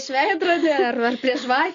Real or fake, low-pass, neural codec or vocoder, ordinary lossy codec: real; 7.2 kHz; none; MP3, 64 kbps